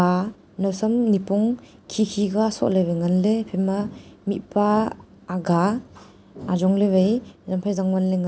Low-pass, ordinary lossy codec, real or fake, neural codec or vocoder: none; none; real; none